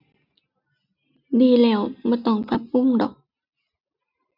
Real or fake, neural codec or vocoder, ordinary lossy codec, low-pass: real; none; none; 5.4 kHz